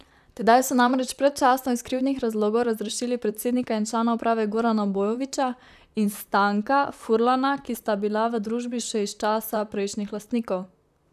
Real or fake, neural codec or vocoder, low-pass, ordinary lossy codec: fake; vocoder, 44.1 kHz, 128 mel bands, Pupu-Vocoder; 14.4 kHz; none